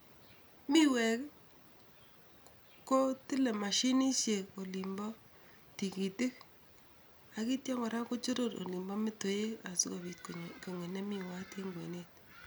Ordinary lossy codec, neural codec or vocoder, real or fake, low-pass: none; none; real; none